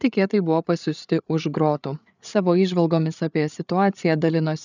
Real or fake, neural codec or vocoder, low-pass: fake; codec, 16 kHz, 8 kbps, FreqCodec, larger model; 7.2 kHz